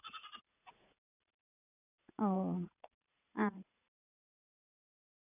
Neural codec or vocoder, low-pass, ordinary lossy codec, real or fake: vocoder, 44.1 kHz, 80 mel bands, Vocos; 3.6 kHz; none; fake